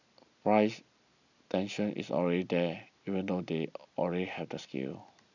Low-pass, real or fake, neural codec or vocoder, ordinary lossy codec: 7.2 kHz; real; none; none